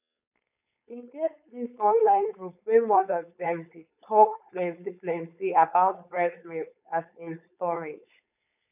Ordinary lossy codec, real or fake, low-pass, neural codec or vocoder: none; fake; 3.6 kHz; codec, 16 kHz, 4.8 kbps, FACodec